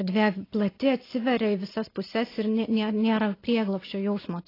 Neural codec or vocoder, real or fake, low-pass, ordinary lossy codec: none; real; 5.4 kHz; AAC, 24 kbps